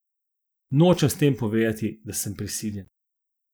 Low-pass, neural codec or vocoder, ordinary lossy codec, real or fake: none; none; none; real